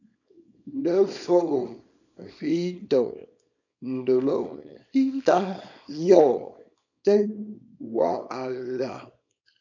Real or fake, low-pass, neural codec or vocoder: fake; 7.2 kHz; codec, 24 kHz, 0.9 kbps, WavTokenizer, small release